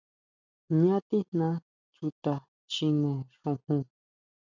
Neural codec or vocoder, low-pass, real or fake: none; 7.2 kHz; real